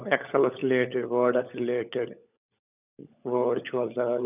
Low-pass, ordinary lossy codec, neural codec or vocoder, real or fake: 3.6 kHz; none; codec, 16 kHz, 16 kbps, FunCodec, trained on LibriTTS, 50 frames a second; fake